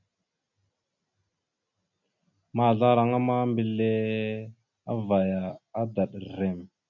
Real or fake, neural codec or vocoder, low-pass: real; none; 7.2 kHz